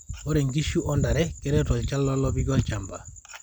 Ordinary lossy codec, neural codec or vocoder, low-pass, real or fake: none; none; 19.8 kHz; real